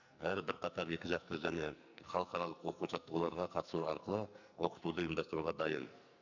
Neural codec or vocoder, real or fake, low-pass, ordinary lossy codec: codec, 44.1 kHz, 2.6 kbps, SNAC; fake; 7.2 kHz; none